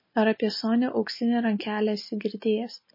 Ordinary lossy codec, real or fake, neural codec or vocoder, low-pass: MP3, 24 kbps; real; none; 5.4 kHz